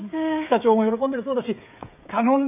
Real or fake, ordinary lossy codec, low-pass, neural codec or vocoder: fake; none; 3.6 kHz; codec, 16 kHz, 16 kbps, FreqCodec, smaller model